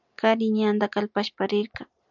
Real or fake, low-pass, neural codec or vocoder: real; 7.2 kHz; none